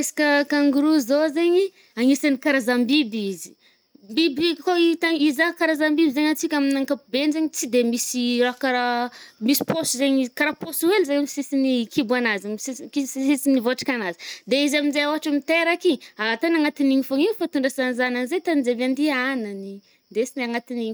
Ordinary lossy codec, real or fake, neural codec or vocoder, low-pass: none; real; none; none